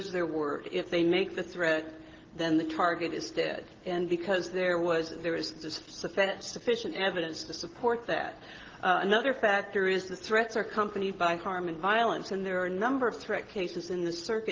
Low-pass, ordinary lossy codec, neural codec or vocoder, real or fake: 7.2 kHz; Opus, 16 kbps; none; real